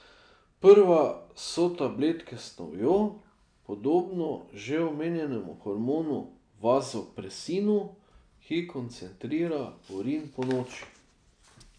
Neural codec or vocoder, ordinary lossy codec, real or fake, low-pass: none; none; real; 9.9 kHz